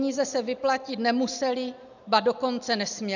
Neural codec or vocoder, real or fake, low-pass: none; real; 7.2 kHz